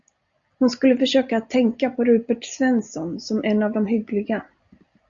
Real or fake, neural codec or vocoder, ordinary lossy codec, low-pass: real; none; Opus, 64 kbps; 7.2 kHz